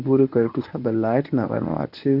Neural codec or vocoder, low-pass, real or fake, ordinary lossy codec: codec, 24 kHz, 0.9 kbps, WavTokenizer, medium speech release version 1; 5.4 kHz; fake; AAC, 48 kbps